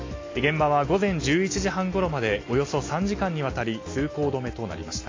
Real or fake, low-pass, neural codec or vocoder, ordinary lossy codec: real; 7.2 kHz; none; AAC, 32 kbps